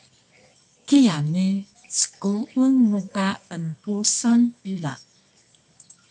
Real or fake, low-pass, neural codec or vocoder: fake; 10.8 kHz; codec, 24 kHz, 0.9 kbps, WavTokenizer, medium music audio release